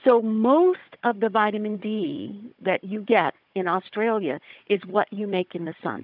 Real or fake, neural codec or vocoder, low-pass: fake; codec, 16 kHz, 16 kbps, FunCodec, trained on Chinese and English, 50 frames a second; 5.4 kHz